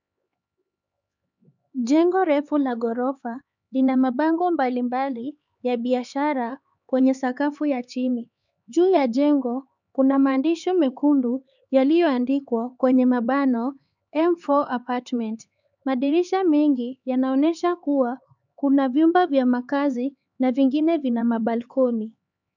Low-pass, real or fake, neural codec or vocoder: 7.2 kHz; fake; codec, 16 kHz, 4 kbps, X-Codec, HuBERT features, trained on LibriSpeech